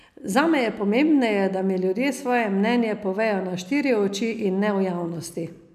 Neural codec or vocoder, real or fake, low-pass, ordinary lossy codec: none; real; 14.4 kHz; none